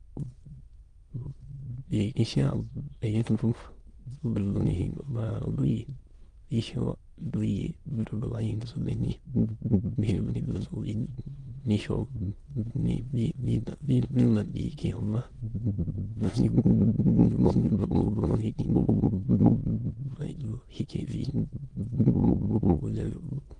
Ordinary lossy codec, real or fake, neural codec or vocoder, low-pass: Opus, 24 kbps; fake; autoencoder, 22.05 kHz, a latent of 192 numbers a frame, VITS, trained on many speakers; 9.9 kHz